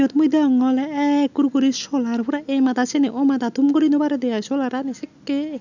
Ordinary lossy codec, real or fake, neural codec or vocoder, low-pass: none; real; none; 7.2 kHz